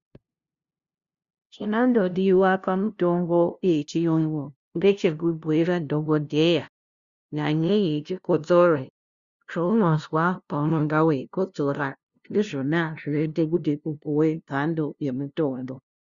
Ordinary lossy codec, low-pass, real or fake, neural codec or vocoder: Opus, 64 kbps; 7.2 kHz; fake; codec, 16 kHz, 0.5 kbps, FunCodec, trained on LibriTTS, 25 frames a second